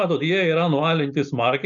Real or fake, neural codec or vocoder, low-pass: real; none; 7.2 kHz